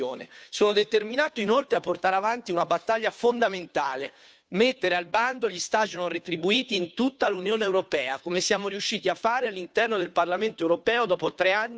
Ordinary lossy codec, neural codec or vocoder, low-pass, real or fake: none; codec, 16 kHz, 2 kbps, FunCodec, trained on Chinese and English, 25 frames a second; none; fake